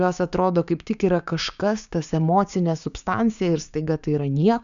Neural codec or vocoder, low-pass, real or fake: codec, 16 kHz, 6 kbps, DAC; 7.2 kHz; fake